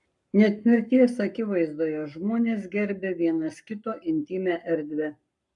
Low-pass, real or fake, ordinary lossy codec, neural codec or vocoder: 10.8 kHz; fake; MP3, 96 kbps; codec, 44.1 kHz, 7.8 kbps, Pupu-Codec